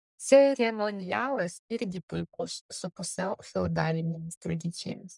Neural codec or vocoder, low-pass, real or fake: codec, 44.1 kHz, 1.7 kbps, Pupu-Codec; 10.8 kHz; fake